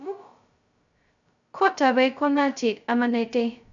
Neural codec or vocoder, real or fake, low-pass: codec, 16 kHz, 0.2 kbps, FocalCodec; fake; 7.2 kHz